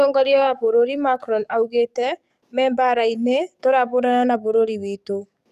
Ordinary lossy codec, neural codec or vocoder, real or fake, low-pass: none; codec, 44.1 kHz, 7.8 kbps, DAC; fake; 14.4 kHz